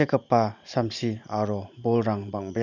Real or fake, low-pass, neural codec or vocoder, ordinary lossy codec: fake; 7.2 kHz; vocoder, 44.1 kHz, 128 mel bands every 512 samples, BigVGAN v2; none